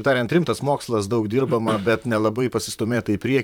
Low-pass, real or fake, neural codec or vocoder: 19.8 kHz; fake; vocoder, 44.1 kHz, 128 mel bands, Pupu-Vocoder